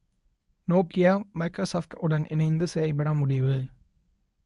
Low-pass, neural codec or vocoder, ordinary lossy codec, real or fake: 10.8 kHz; codec, 24 kHz, 0.9 kbps, WavTokenizer, medium speech release version 1; AAC, 96 kbps; fake